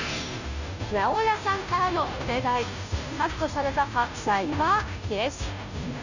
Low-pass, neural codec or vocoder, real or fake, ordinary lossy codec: 7.2 kHz; codec, 16 kHz, 0.5 kbps, FunCodec, trained on Chinese and English, 25 frames a second; fake; none